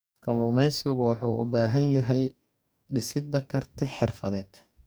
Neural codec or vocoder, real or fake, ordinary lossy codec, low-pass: codec, 44.1 kHz, 2.6 kbps, DAC; fake; none; none